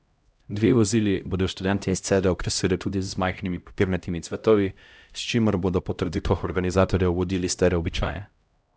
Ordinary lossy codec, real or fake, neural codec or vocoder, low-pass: none; fake; codec, 16 kHz, 0.5 kbps, X-Codec, HuBERT features, trained on LibriSpeech; none